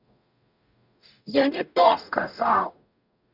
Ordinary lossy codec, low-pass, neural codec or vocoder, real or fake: none; 5.4 kHz; codec, 44.1 kHz, 0.9 kbps, DAC; fake